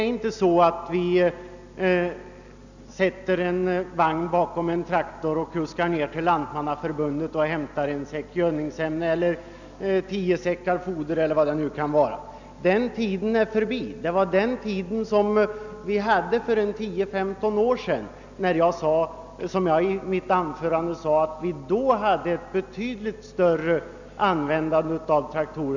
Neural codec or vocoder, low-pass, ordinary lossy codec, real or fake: none; 7.2 kHz; none; real